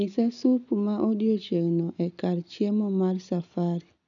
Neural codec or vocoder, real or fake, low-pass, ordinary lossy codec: none; real; 7.2 kHz; none